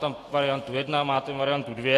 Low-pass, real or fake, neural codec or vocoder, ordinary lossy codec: 14.4 kHz; fake; autoencoder, 48 kHz, 128 numbers a frame, DAC-VAE, trained on Japanese speech; AAC, 48 kbps